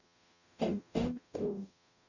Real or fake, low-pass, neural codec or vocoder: fake; 7.2 kHz; codec, 44.1 kHz, 0.9 kbps, DAC